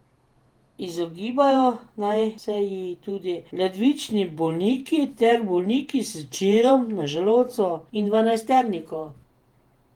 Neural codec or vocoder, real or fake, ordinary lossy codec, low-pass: vocoder, 48 kHz, 128 mel bands, Vocos; fake; Opus, 24 kbps; 19.8 kHz